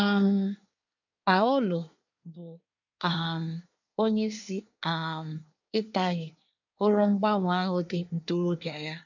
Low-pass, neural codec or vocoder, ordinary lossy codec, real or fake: 7.2 kHz; codec, 24 kHz, 1 kbps, SNAC; none; fake